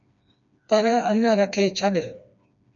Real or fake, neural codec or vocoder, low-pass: fake; codec, 16 kHz, 2 kbps, FreqCodec, smaller model; 7.2 kHz